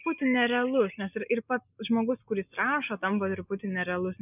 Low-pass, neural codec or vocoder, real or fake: 3.6 kHz; none; real